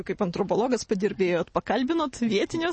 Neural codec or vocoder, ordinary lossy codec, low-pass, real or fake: none; MP3, 32 kbps; 10.8 kHz; real